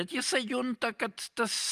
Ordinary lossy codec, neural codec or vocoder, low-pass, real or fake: Opus, 32 kbps; none; 14.4 kHz; real